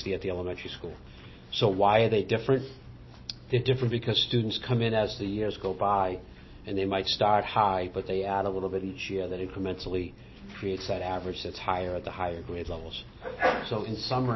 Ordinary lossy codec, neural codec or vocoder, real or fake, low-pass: MP3, 24 kbps; none; real; 7.2 kHz